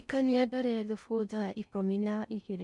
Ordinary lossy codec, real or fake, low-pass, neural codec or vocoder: none; fake; 10.8 kHz; codec, 16 kHz in and 24 kHz out, 0.6 kbps, FocalCodec, streaming, 4096 codes